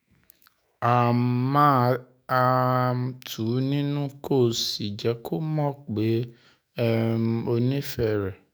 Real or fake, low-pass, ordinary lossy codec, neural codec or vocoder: fake; none; none; autoencoder, 48 kHz, 128 numbers a frame, DAC-VAE, trained on Japanese speech